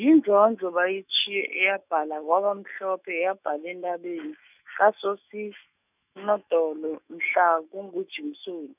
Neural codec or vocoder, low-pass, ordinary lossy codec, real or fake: autoencoder, 48 kHz, 128 numbers a frame, DAC-VAE, trained on Japanese speech; 3.6 kHz; none; fake